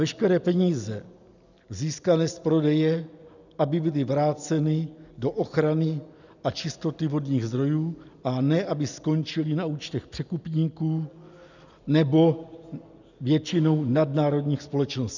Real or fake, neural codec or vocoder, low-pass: real; none; 7.2 kHz